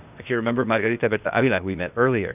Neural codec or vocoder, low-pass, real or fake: codec, 16 kHz, 0.8 kbps, ZipCodec; 3.6 kHz; fake